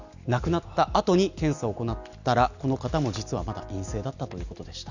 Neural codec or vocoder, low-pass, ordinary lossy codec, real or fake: none; 7.2 kHz; none; real